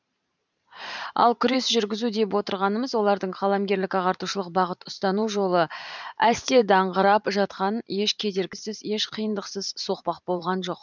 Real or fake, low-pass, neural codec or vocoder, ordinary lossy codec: fake; 7.2 kHz; vocoder, 44.1 kHz, 128 mel bands every 512 samples, BigVGAN v2; none